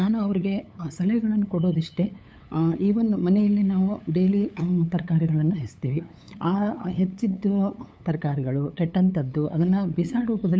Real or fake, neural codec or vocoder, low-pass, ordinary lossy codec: fake; codec, 16 kHz, 8 kbps, FunCodec, trained on LibriTTS, 25 frames a second; none; none